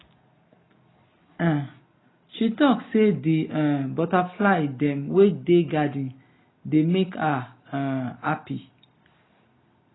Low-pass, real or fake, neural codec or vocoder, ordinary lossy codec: 7.2 kHz; real; none; AAC, 16 kbps